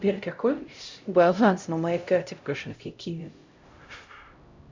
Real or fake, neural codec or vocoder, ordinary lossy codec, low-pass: fake; codec, 16 kHz, 0.5 kbps, X-Codec, HuBERT features, trained on LibriSpeech; MP3, 64 kbps; 7.2 kHz